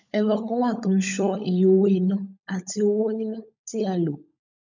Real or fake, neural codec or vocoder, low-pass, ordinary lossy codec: fake; codec, 16 kHz, 16 kbps, FunCodec, trained on LibriTTS, 50 frames a second; 7.2 kHz; none